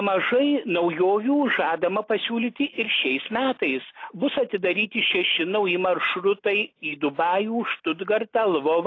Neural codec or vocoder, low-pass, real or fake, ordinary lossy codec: none; 7.2 kHz; real; AAC, 32 kbps